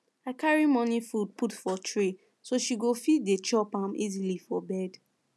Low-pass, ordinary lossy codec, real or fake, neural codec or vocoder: none; none; real; none